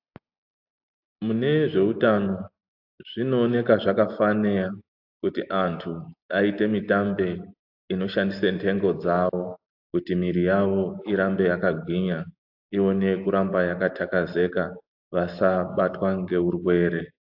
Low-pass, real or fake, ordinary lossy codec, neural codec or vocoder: 5.4 kHz; real; AAC, 48 kbps; none